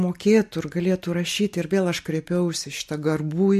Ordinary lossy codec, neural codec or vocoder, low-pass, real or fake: MP3, 64 kbps; none; 14.4 kHz; real